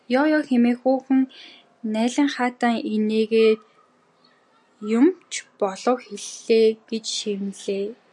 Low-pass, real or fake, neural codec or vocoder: 10.8 kHz; real; none